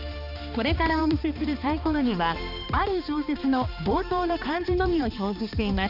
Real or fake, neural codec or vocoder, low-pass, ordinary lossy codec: fake; codec, 16 kHz, 2 kbps, X-Codec, HuBERT features, trained on balanced general audio; 5.4 kHz; none